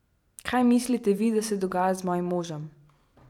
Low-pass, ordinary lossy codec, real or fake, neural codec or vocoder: 19.8 kHz; none; fake; vocoder, 44.1 kHz, 128 mel bands every 512 samples, BigVGAN v2